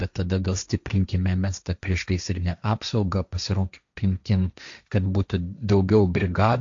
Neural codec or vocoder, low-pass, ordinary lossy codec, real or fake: codec, 16 kHz, 1.1 kbps, Voila-Tokenizer; 7.2 kHz; AAC, 64 kbps; fake